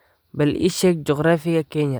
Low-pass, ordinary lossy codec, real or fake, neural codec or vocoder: none; none; real; none